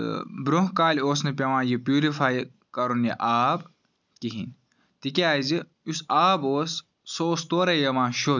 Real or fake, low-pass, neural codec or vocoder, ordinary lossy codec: real; 7.2 kHz; none; none